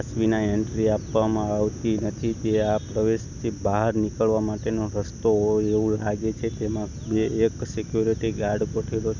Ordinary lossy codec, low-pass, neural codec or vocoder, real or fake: none; 7.2 kHz; none; real